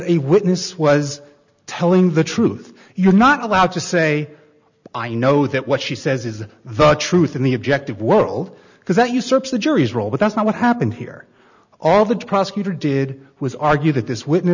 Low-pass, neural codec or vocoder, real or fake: 7.2 kHz; none; real